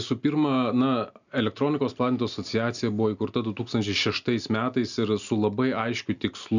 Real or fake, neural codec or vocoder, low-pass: real; none; 7.2 kHz